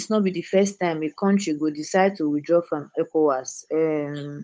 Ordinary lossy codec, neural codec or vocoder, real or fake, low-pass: none; codec, 16 kHz, 8 kbps, FunCodec, trained on Chinese and English, 25 frames a second; fake; none